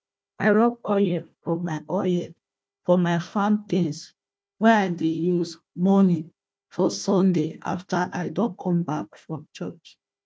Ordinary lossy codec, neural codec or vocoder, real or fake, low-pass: none; codec, 16 kHz, 1 kbps, FunCodec, trained on Chinese and English, 50 frames a second; fake; none